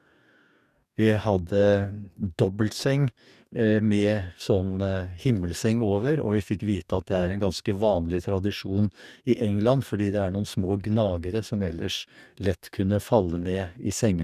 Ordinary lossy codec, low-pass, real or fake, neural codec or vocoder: none; 14.4 kHz; fake; codec, 44.1 kHz, 2.6 kbps, DAC